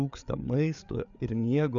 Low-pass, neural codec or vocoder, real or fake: 7.2 kHz; codec, 16 kHz, 8 kbps, FreqCodec, larger model; fake